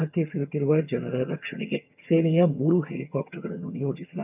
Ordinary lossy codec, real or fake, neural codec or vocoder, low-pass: none; fake; vocoder, 22.05 kHz, 80 mel bands, HiFi-GAN; 3.6 kHz